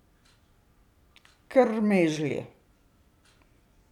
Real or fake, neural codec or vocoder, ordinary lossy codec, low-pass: real; none; none; 19.8 kHz